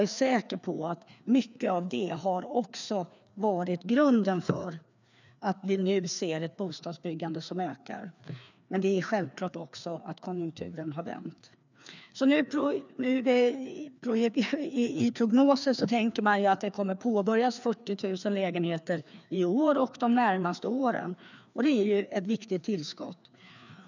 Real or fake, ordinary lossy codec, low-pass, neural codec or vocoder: fake; none; 7.2 kHz; codec, 16 kHz, 2 kbps, FreqCodec, larger model